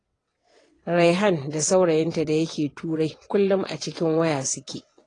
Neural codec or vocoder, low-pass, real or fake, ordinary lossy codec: vocoder, 22.05 kHz, 80 mel bands, WaveNeXt; 9.9 kHz; fake; AAC, 32 kbps